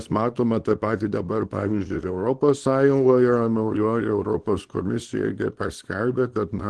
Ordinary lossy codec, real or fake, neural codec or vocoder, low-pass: Opus, 16 kbps; fake; codec, 24 kHz, 0.9 kbps, WavTokenizer, small release; 10.8 kHz